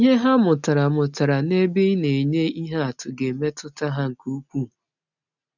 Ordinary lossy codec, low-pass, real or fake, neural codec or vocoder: none; 7.2 kHz; real; none